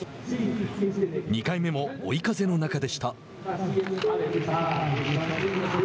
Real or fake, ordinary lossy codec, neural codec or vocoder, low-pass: real; none; none; none